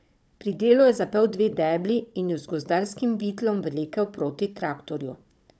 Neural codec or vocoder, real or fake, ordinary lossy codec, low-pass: codec, 16 kHz, 16 kbps, FunCodec, trained on Chinese and English, 50 frames a second; fake; none; none